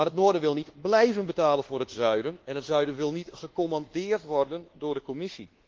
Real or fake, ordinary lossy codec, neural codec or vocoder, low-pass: fake; Opus, 16 kbps; codec, 24 kHz, 1.2 kbps, DualCodec; 7.2 kHz